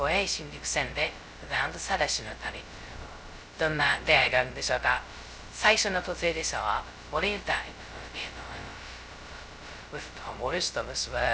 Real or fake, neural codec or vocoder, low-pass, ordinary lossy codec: fake; codec, 16 kHz, 0.2 kbps, FocalCodec; none; none